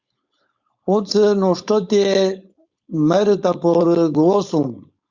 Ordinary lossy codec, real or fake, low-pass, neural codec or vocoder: Opus, 64 kbps; fake; 7.2 kHz; codec, 16 kHz, 4.8 kbps, FACodec